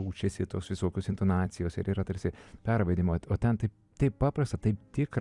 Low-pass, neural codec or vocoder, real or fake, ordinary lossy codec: 10.8 kHz; vocoder, 44.1 kHz, 128 mel bands every 256 samples, BigVGAN v2; fake; Opus, 64 kbps